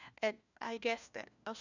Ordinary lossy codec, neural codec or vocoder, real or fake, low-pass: none; codec, 16 kHz, 1 kbps, FunCodec, trained on LibriTTS, 50 frames a second; fake; 7.2 kHz